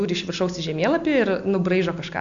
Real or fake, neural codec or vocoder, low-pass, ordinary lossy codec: real; none; 7.2 kHz; AAC, 64 kbps